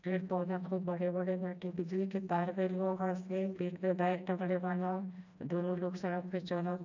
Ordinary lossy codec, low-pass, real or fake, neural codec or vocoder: none; 7.2 kHz; fake; codec, 16 kHz, 1 kbps, FreqCodec, smaller model